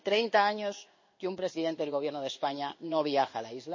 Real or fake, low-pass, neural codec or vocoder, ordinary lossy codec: fake; 7.2 kHz; codec, 16 kHz in and 24 kHz out, 1 kbps, XY-Tokenizer; MP3, 32 kbps